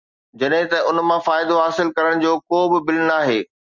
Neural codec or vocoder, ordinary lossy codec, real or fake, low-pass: none; Opus, 64 kbps; real; 7.2 kHz